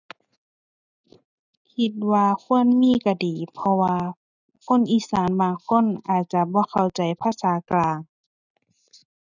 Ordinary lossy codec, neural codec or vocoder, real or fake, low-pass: none; none; real; 7.2 kHz